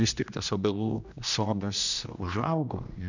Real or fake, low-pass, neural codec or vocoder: fake; 7.2 kHz; codec, 16 kHz, 1 kbps, X-Codec, HuBERT features, trained on general audio